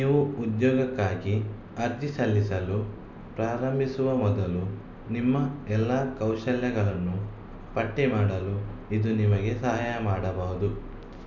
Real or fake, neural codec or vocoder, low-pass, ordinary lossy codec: real; none; 7.2 kHz; none